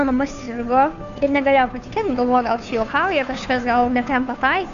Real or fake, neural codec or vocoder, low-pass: fake; codec, 16 kHz, 2 kbps, FunCodec, trained on Chinese and English, 25 frames a second; 7.2 kHz